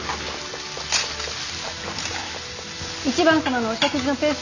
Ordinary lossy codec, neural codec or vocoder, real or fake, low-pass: none; none; real; 7.2 kHz